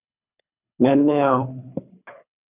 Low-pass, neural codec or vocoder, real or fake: 3.6 kHz; codec, 24 kHz, 3 kbps, HILCodec; fake